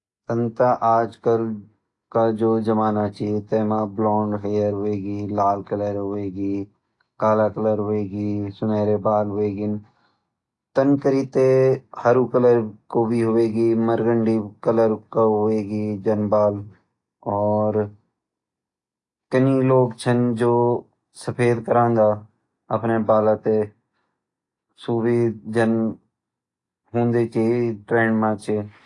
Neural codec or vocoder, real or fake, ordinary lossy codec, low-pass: none; real; AAC, 48 kbps; 10.8 kHz